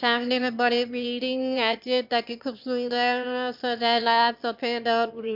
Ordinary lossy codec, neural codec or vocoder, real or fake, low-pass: MP3, 48 kbps; autoencoder, 22.05 kHz, a latent of 192 numbers a frame, VITS, trained on one speaker; fake; 5.4 kHz